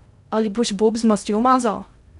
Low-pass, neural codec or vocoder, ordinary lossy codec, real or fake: 10.8 kHz; codec, 16 kHz in and 24 kHz out, 0.6 kbps, FocalCodec, streaming, 2048 codes; none; fake